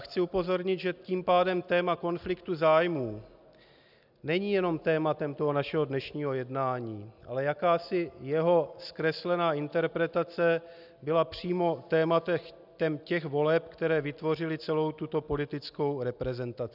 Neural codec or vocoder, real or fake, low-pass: none; real; 5.4 kHz